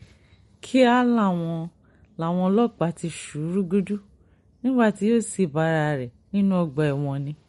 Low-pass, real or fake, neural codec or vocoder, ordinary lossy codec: 19.8 kHz; real; none; MP3, 48 kbps